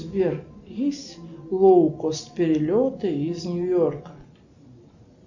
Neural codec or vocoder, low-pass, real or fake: none; 7.2 kHz; real